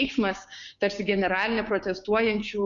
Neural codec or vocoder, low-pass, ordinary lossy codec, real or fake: codec, 16 kHz, 6 kbps, DAC; 7.2 kHz; Opus, 64 kbps; fake